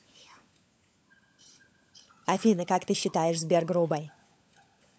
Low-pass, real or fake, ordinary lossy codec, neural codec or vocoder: none; fake; none; codec, 16 kHz, 16 kbps, FunCodec, trained on LibriTTS, 50 frames a second